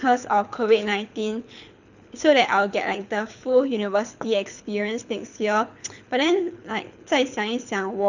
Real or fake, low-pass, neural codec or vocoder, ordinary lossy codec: fake; 7.2 kHz; codec, 24 kHz, 6 kbps, HILCodec; none